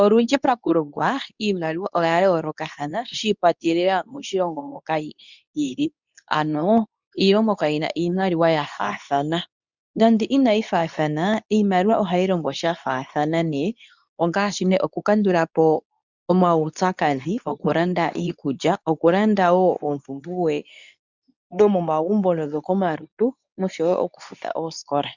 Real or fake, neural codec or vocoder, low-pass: fake; codec, 24 kHz, 0.9 kbps, WavTokenizer, medium speech release version 2; 7.2 kHz